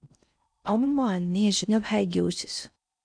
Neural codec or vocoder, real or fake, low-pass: codec, 16 kHz in and 24 kHz out, 0.6 kbps, FocalCodec, streaming, 4096 codes; fake; 9.9 kHz